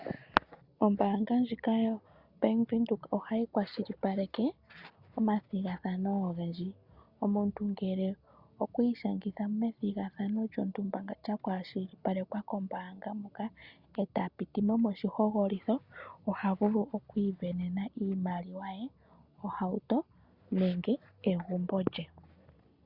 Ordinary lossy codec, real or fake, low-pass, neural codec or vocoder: Opus, 64 kbps; real; 5.4 kHz; none